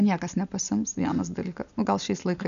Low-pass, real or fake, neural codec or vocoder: 7.2 kHz; real; none